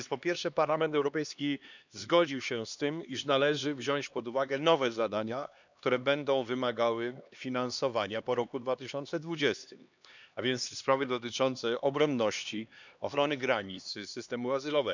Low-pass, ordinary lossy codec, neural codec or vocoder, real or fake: 7.2 kHz; none; codec, 16 kHz, 2 kbps, X-Codec, HuBERT features, trained on LibriSpeech; fake